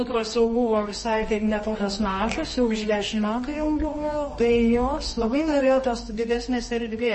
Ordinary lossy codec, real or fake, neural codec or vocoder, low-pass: MP3, 32 kbps; fake; codec, 24 kHz, 0.9 kbps, WavTokenizer, medium music audio release; 10.8 kHz